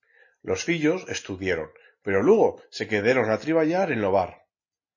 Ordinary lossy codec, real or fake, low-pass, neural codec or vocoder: MP3, 32 kbps; real; 7.2 kHz; none